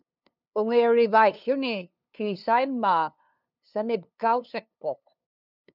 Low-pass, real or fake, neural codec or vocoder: 5.4 kHz; fake; codec, 16 kHz, 2 kbps, FunCodec, trained on LibriTTS, 25 frames a second